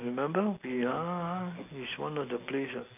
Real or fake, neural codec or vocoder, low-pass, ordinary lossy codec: real; none; 3.6 kHz; none